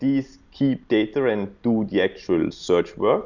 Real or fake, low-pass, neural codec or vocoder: real; 7.2 kHz; none